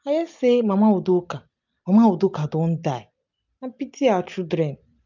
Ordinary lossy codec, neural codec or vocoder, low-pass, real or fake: none; none; 7.2 kHz; real